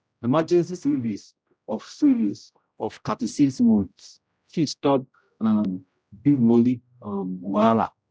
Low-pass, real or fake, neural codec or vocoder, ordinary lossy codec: none; fake; codec, 16 kHz, 0.5 kbps, X-Codec, HuBERT features, trained on general audio; none